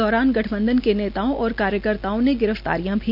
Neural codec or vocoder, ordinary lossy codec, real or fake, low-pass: none; none; real; 5.4 kHz